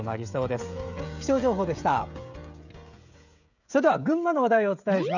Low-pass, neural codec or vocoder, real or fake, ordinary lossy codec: 7.2 kHz; codec, 16 kHz, 16 kbps, FreqCodec, smaller model; fake; none